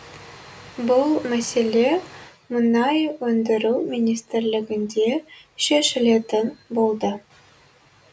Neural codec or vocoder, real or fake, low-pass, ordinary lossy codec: none; real; none; none